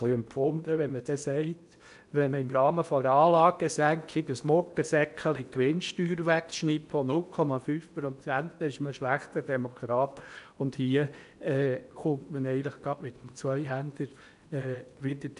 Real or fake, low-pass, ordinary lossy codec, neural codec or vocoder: fake; 10.8 kHz; none; codec, 16 kHz in and 24 kHz out, 0.8 kbps, FocalCodec, streaming, 65536 codes